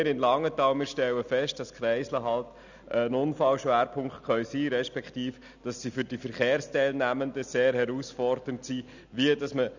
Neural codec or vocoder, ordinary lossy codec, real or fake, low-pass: none; none; real; 7.2 kHz